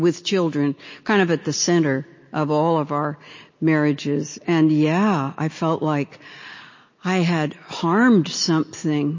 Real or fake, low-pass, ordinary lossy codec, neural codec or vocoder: real; 7.2 kHz; MP3, 32 kbps; none